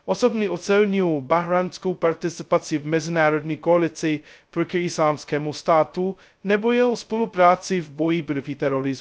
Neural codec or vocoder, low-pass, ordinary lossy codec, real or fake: codec, 16 kHz, 0.2 kbps, FocalCodec; none; none; fake